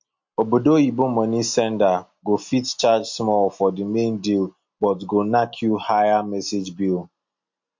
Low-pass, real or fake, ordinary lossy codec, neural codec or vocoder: 7.2 kHz; real; MP3, 48 kbps; none